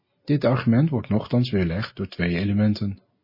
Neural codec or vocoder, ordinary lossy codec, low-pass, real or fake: none; MP3, 24 kbps; 5.4 kHz; real